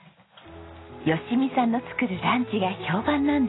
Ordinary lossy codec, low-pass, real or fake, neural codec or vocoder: AAC, 16 kbps; 7.2 kHz; real; none